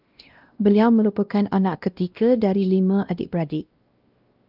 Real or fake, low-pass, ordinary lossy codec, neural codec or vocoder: fake; 5.4 kHz; Opus, 32 kbps; codec, 16 kHz, 1 kbps, X-Codec, WavLM features, trained on Multilingual LibriSpeech